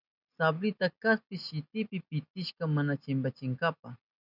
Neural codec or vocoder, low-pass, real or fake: none; 5.4 kHz; real